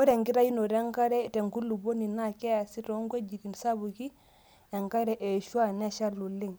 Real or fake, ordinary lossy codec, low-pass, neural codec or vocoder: real; none; none; none